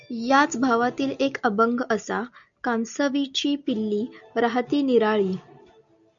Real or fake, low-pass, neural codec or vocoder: real; 7.2 kHz; none